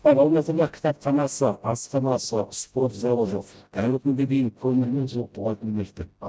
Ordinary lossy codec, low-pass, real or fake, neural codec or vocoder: none; none; fake; codec, 16 kHz, 0.5 kbps, FreqCodec, smaller model